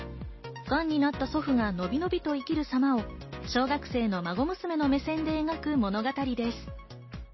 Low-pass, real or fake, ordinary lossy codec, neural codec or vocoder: 7.2 kHz; real; MP3, 24 kbps; none